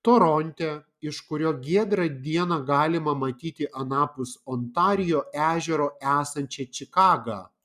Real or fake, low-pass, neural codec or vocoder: real; 14.4 kHz; none